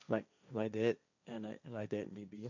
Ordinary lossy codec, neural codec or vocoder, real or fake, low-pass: none; codec, 16 kHz, 1.1 kbps, Voila-Tokenizer; fake; 7.2 kHz